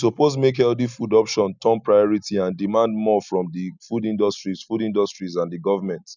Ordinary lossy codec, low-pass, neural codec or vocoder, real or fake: none; 7.2 kHz; none; real